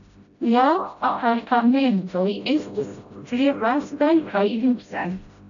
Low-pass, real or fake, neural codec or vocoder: 7.2 kHz; fake; codec, 16 kHz, 0.5 kbps, FreqCodec, smaller model